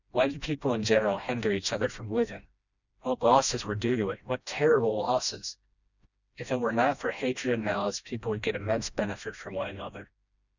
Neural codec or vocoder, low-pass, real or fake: codec, 16 kHz, 1 kbps, FreqCodec, smaller model; 7.2 kHz; fake